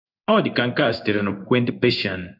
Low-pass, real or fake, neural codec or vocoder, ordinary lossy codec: 5.4 kHz; fake; codec, 16 kHz in and 24 kHz out, 1 kbps, XY-Tokenizer; none